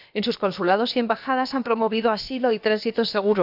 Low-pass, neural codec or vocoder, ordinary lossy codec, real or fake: 5.4 kHz; codec, 16 kHz, about 1 kbps, DyCAST, with the encoder's durations; none; fake